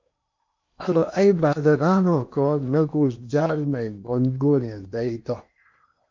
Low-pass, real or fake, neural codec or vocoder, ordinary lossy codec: 7.2 kHz; fake; codec, 16 kHz in and 24 kHz out, 0.8 kbps, FocalCodec, streaming, 65536 codes; MP3, 48 kbps